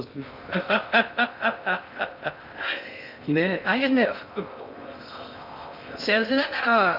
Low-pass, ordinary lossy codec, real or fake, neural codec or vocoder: 5.4 kHz; none; fake; codec, 16 kHz in and 24 kHz out, 0.6 kbps, FocalCodec, streaming, 2048 codes